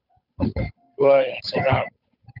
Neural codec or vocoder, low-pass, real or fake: codec, 16 kHz, 8 kbps, FunCodec, trained on Chinese and English, 25 frames a second; 5.4 kHz; fake